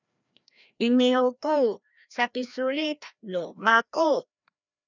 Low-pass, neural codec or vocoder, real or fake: 7.2 kHz; codec, 16 kHz, 1 kbps, FreqCodec, larger model; fake